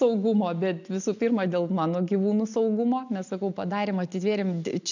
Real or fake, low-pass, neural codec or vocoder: real; 7.2 kHz; none